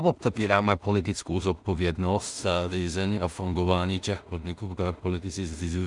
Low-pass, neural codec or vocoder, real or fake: 10.8 kHz; codec, 16 kHz in and 24 kHz out, 0.4 kbps, LongCat-Audio-Codec, two codebook decoder; fake